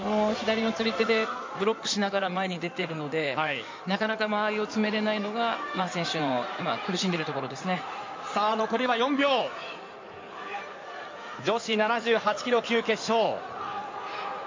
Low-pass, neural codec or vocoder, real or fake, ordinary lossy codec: 7.2 kHz; codec, 16 kHz in and 24 kHz out, 2.2 kbps, FireRedTTS-2 codec; fake; MP3, 48 kbps